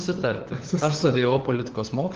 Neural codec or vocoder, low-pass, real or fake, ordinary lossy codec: codec, 16 kHz, 4 kbps, FunCodec, trained on LibriTTS, 50 frames a second; 7.2 kHz; fake; Opus, 16 kbps